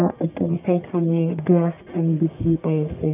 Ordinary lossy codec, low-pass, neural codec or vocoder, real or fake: none; 3.6 kHz; codec, 44.1 kHz, 1.7 kbps, Pupu-Codec; fake